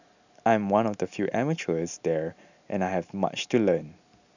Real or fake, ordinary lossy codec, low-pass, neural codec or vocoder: real; none; 7.2 kHz; none